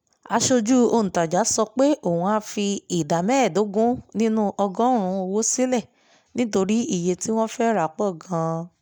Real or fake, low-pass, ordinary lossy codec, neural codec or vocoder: real; none; none; none